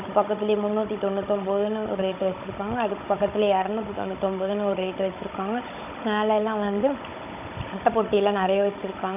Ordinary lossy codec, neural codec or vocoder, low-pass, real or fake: none; codec, 16 kHz, 16 kbps, FunCodec, trained on Chinese and English, 50 frames a second; 3.6 kHz; fake